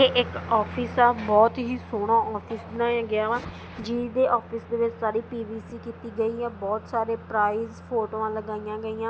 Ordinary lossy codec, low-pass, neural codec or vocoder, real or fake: none; none; none; real